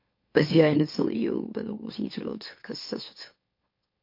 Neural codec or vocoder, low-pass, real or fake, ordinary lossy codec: autoencoder, 44.1 kHz, a latent of 192 numbers a frame, MeloTTS; 5.4 kHz; fake; MP3, 32 kbps